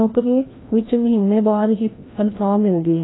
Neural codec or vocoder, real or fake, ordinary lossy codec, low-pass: codec, 16 kHz, 1 kbps, FreqCodec, larger model; fake; AAC, 16 kbps; 7.2 kHz